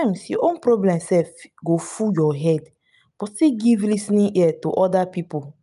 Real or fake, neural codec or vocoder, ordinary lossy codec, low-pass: real; none; none; 10.8 kHz